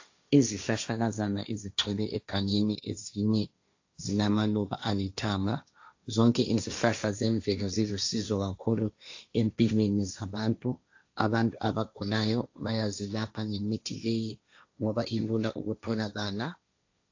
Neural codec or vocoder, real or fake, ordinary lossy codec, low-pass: codec, 16 kHz, 1.1 kbps, Voila-Tokenizer; fake; AAC, 48 kbps; 7.2 kHz